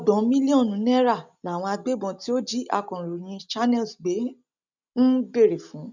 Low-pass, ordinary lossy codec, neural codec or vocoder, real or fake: 7.2 kHz; none; none; real